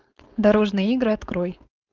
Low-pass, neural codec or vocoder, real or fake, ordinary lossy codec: 7.2 kHz; codec, 16 kHz, 4.8 kbps, FACodec; fake; Opus, 32 kbps